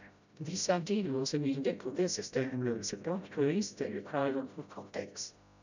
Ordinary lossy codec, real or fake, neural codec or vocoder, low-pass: none; fake; codec, 16 kHz, 0.5 kbps, FreqCodec, smaller model; 7.2 kHz